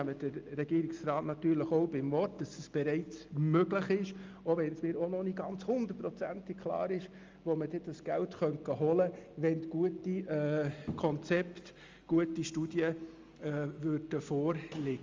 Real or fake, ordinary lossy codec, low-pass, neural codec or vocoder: real; Opus, 32 kbps; 7.2 kHz; none